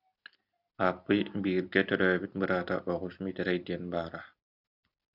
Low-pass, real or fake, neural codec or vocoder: 5.4 kHz; real; none